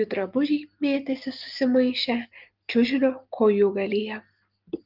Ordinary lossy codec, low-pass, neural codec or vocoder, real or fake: Opus, 24 kbps; 5.4 kHz; none; real